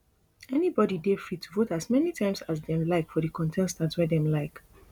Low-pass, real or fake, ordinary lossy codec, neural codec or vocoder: 19.8 kHz; real; none; none